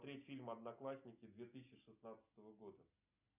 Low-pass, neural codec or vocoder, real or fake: 3.6 kHz; none; real